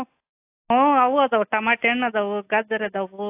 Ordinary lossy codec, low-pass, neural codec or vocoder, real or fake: AAC, 24 kbps; 3.6 kHz; none; real